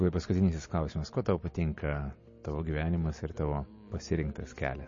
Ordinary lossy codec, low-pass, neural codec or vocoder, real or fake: MP3, 32 kbps; 7.2 kHz; none; real